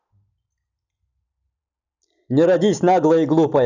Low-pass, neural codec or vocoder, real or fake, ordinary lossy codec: 7.2 kHz; none; real; none